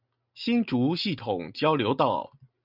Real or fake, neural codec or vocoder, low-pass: real; none; 5.4 kHz